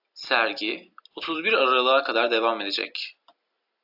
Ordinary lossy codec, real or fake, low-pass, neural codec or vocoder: AAC, 48 kbps; real; 5.4 kHz; none